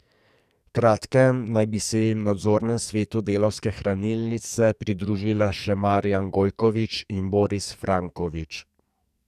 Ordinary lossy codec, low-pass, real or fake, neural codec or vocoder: none; 14.4 kHz; fake; codec, 44.1 kHz, 2.6 kbps, SNAC